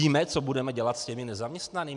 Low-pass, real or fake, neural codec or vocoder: 10.8 kHz; real; none